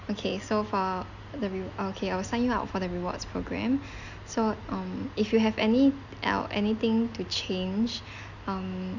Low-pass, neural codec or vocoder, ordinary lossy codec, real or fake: 7.2 kHz; none; none; real